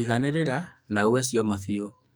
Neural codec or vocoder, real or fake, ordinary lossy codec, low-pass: codec, 44.1 kHz, 2.6 kbps, SNAC; fake; none; none